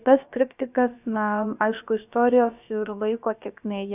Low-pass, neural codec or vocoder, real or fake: 3.6 kHz; codec, 16 kHz, about 1 kbps, DyCAST, with the encoder's durations; fake